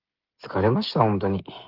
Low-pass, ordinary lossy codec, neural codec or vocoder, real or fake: 5.4 kHz; Opus, 16 kbps; codec, 16 kHz, 16 kbps, FreqCodec, smaller model; fake